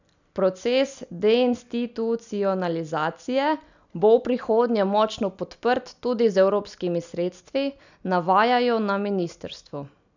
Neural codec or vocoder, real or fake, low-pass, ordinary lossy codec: none; real; 7.2 kHz; none